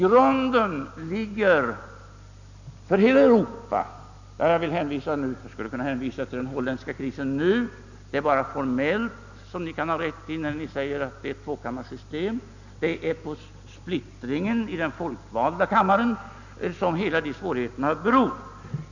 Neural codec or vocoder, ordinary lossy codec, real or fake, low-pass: none; none; real; 7.2 kHz